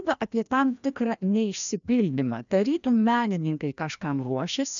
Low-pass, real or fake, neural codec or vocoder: 7.2 kHz; fake; codec, 16 kHz, 1 kbps, FreqCodec, larger model